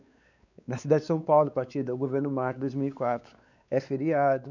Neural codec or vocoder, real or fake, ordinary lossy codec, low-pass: codec, 16 kHz, 4 kbps, X-Codec, WavLM features, trained on Multilingual LibriSpeech; fake; none; 7.2 kHz